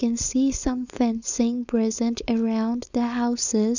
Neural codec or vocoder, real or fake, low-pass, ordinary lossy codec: codec, 16 kHz, 4.8 kbps, FACodec; fake; 7.2 kHz; none